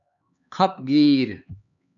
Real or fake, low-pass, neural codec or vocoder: fake; 7.2 kHz; codec, 16 kHz, 4 kbps, X-Codec, HuBERT features, trained on LibriSpeech